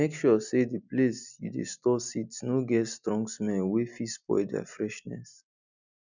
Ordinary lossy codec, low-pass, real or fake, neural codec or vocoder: none; 7.2 kHz; real; none